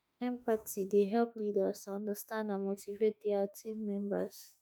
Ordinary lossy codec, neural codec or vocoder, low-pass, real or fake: none; autoencoder, 48 kHz, 32 numbers a frame, DAC-VAE, trained on Japanese speech; none; fake